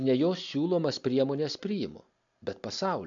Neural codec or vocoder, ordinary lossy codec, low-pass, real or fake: none; AAC, 64 kbps; 7.2 kHz; real